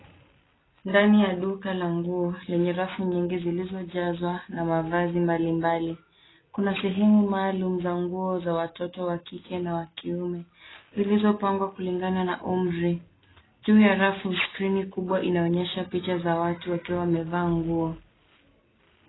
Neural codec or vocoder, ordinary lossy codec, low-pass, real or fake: none; AAC, 16 kbps; 7.2 kHz; real